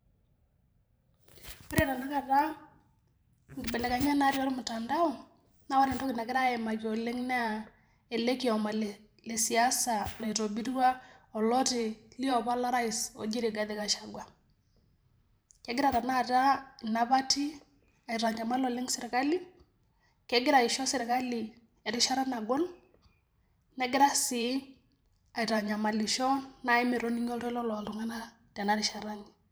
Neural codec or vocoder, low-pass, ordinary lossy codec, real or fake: vocoder, 44.1 kHz, 128 mel bands every 512 samples, BigVGAN v2; none; none; fake